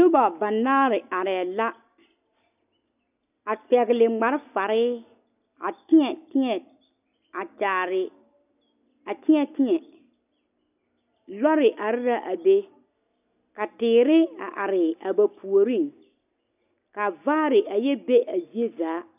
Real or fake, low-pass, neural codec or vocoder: real; 3.6 kHz; none